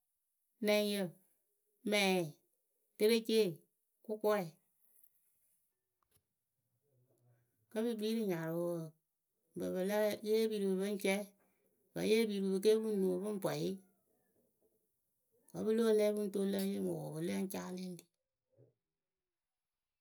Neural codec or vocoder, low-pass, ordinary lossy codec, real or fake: none; none; none; real